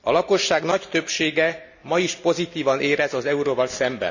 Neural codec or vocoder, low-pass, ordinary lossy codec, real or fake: none; 7.2 kHz; none; real